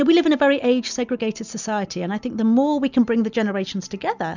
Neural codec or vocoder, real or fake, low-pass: none; real; 7.2 kHz